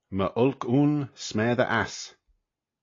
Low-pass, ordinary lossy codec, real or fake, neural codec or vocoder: 7.2 kHz; AAC, 32 kbps; real; none